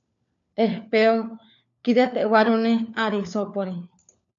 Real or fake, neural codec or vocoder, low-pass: fake; codec, 16 kHz, 4 kbps, FunCodec, trained on LibriTTS, 50 frames a second; 7.2 kHz